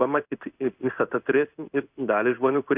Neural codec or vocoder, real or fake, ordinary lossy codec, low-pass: codec, 16 kHz in and 24 kHz out, 1 kbps, XY-Tokenizer; fake; Opus, 64 kbps; 3.6 kHz